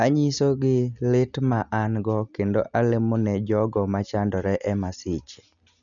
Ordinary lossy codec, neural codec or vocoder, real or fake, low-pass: none; none; real; 7.2 kHz